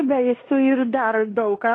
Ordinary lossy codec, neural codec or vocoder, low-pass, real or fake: AAC, 32 kbps; codec, 16 kHz in and 24 kHz out, 0.9 kbps, LongCat-Audio-Codec, fine tuned four codebook decoder; 9.9 kHz; fake